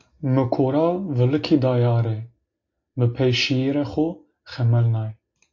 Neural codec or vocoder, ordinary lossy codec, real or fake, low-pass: none; AAC, 48 kbps; real; 7.2 kHz